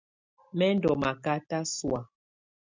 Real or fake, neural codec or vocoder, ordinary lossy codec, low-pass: real; none; MP3, 64 kbps; 7.2 kHz